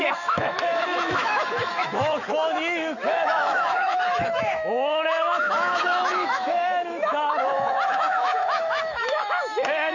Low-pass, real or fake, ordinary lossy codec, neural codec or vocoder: 7.2 kHz; fake; none; autoencoder, 48 kHz, 128 numbers a frame, DAC-VAE, trained on Japanese speech